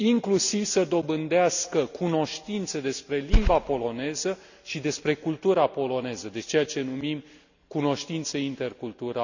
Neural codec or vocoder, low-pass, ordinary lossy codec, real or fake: none; 7.2 kHz; none; real